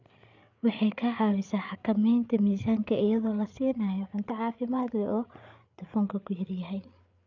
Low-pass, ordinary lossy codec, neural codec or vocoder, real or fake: 7.2 kHz; none; codec, 16 kHz, 8 kbps, FreqCodec, larger model; fake